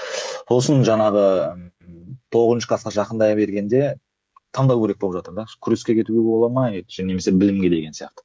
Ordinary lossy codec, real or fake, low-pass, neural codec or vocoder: none; fake; none; codec, 16 kHz, 16 kbps, FreqCodec, smaller model